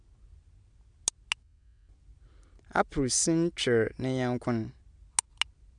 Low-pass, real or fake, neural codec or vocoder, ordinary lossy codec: 9.9 kHz; real; none; none